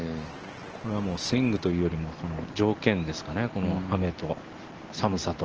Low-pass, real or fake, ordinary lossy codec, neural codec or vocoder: 7.2 kHz; real; Opus, 16 kbps; none